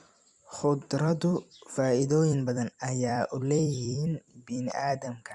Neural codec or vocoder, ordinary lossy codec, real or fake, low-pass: vocoder, 44.1 kHz, 128 mel bands every 256 samples, BigVGAN v2; Opus, 64 kbps; fake; 10.8 kHz